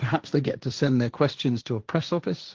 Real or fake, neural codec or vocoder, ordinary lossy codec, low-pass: fake; codec, 16 kHz, 1.1 kbps, Voila-Tokenizer; Opus, 16 kbps; 7.2 kHz